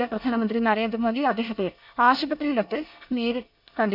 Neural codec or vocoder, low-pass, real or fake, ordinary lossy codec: codec, 24 kHz, 1 kbps, SNAC; 5.4 kHz; fake; none